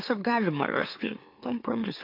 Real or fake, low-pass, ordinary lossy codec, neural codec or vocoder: fake; 5.4 kHz; AAC, 32 kbps; autoencoder, 44.1 kHz, a latent of 192 numbers a frame, MeloTTS